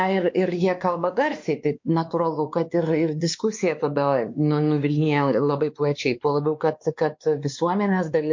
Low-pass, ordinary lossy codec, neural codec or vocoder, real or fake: 7.2 kHz; MP3, 48 kbps; codec, 16 kHz, 2 kbps, X-Codec, WavLM features, trained on Multilingual LibriSpeech; fake